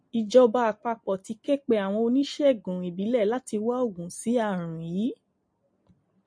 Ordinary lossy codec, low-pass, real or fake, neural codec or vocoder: MP3, 48 kbps; 9.9 kHz; real; none